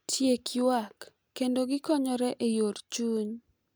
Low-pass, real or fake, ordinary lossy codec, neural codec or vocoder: none; real; none; none